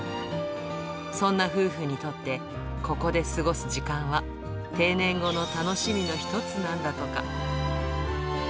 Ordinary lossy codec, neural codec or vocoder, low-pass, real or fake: none; none; none; real